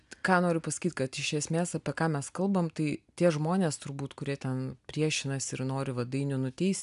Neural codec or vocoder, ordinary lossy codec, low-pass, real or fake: none; MP3, 96 kbps; 10.8 kHz; real